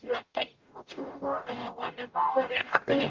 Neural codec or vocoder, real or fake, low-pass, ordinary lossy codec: codec, 44.1 kHz, 0.9 kbps, DAC; fake; 7.2 kHz; Opus, 32 kbps